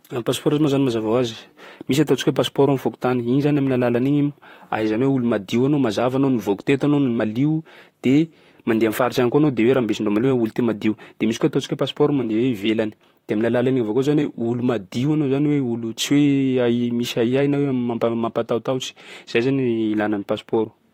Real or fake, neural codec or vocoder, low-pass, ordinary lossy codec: fake; vocoder, 44.1 kHz, 128 mel bands, Pupu-Vocoder; 19.8 kHz; AAC, 48 kbps